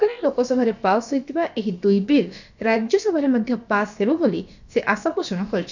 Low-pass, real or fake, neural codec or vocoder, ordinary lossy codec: 7.2 kHz; fake; codec, 16 kHz, about 1 kbps, DyCAST, with the encoder's durations; none